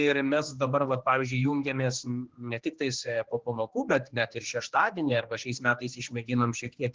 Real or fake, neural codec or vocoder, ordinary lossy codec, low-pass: fake; codec, 16 kHz, 2 kbps, X-Codec, HuBERT features, trained on general audio; Opus, 16 kbps; 7.2 kHz